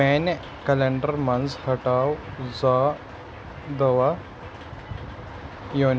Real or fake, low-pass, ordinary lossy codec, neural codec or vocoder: real; none; none; none